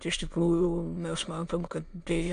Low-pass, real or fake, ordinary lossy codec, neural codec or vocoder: 9.9 kHz; fake; AAC, 64 kbps; autoencoder, 22.05 kHz, a latent of 192 numbers a frame, VITS, trained on many speakers